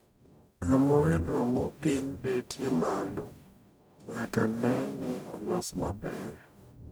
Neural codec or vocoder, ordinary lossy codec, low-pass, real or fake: codec, 44.1 kHz, 0.9 kbps, DAC; none; none; fake